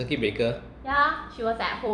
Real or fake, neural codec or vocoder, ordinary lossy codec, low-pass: fake; vocoder, 44.1 kHz, 128 mel bands every 256 samples, BigVGAN v2; none; 9.9 kHz